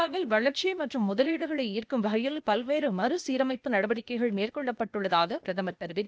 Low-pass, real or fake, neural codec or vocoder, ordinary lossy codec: none; fake; codec, 16 kHz, 0.8 kbps, ZipCodec; none